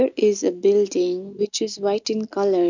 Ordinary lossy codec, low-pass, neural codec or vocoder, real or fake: none; 7.2 kHz; none; real